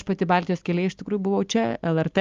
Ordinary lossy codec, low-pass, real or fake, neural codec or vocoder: Opus, 32 kbps; 7.2 kHz; real; none